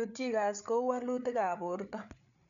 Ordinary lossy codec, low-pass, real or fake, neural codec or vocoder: none; 7.2 kHz; fake; codec, 16 kHz, 8 kbps, FreqCodec, larger model